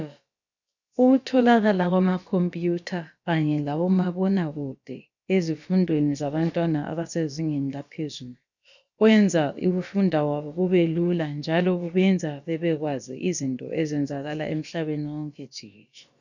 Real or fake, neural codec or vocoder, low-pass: fake; codec, 16 kHz, about 1 kbps, DyCAST, with the encoder's durations; 7.2 kHz